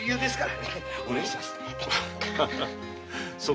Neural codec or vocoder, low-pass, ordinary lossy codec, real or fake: none; none; none; real